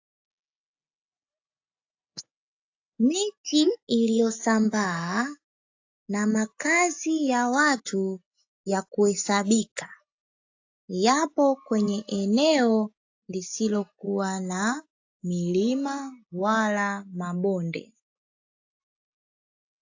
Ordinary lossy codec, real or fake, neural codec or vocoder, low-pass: AAC, 48 kbps; real; none; 7.2 kHz